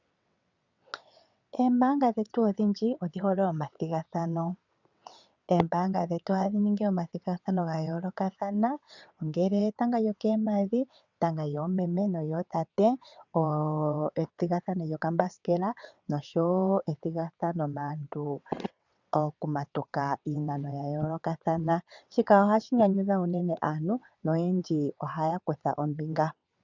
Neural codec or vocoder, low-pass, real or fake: vocoder, 22.05 kHz, 80 mel bands, WaveNeXt; 7.2 kHz; fake